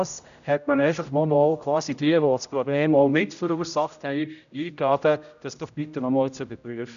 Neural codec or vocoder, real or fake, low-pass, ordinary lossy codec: codec, 16 kHz, 0.5 kbps, X-Codec, HuBERT features, trained on general audio; fake; 7.2 kHz; none